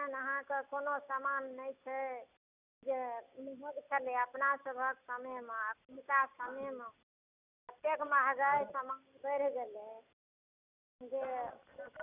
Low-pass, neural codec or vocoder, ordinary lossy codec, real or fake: 3.6 kHz; none; none; real